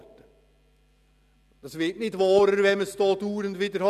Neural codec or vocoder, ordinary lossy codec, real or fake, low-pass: none; none; real; 14.4 kHz